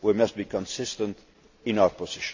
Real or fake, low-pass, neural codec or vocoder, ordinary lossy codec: real; 7.2 kHz; none; AAC, 48 kbps